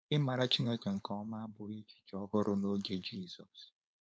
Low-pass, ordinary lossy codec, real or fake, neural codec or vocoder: none; none; fake; codec, 16 kHz, 4.8 kbps, FACodec